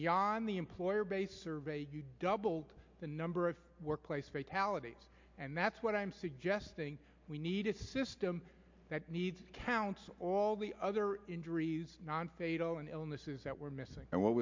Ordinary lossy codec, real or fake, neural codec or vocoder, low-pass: MP3, 48 kbps; real; none; 7.2 kHz